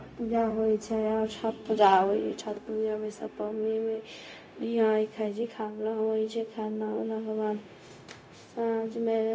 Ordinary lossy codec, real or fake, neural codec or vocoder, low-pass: none; fake; codec, 16 kHz, 0.4 kbps, LongCat-Audio-Codec; none